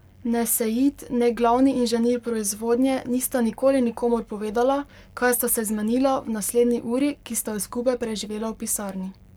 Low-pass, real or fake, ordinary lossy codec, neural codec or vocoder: none; fake; none; codec, 44.1 kHz, 7.8 kbps, Pupu-Codec